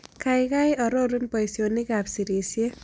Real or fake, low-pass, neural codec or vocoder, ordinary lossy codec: real; none; none; none